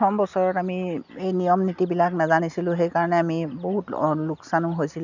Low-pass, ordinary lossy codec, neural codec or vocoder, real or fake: 7.2 kHz; none; none; real